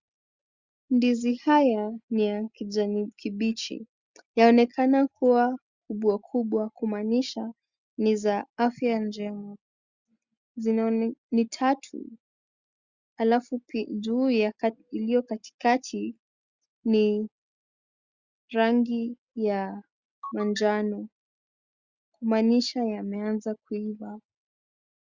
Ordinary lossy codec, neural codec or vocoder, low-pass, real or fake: Opus, 64 kbps; none; 7.2 kHz; real